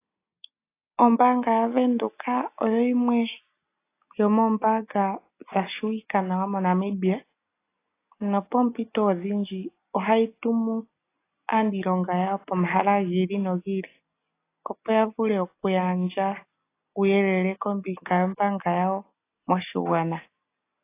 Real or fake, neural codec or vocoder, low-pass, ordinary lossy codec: real; none; 3.6 kHz; AAC, 24 kbps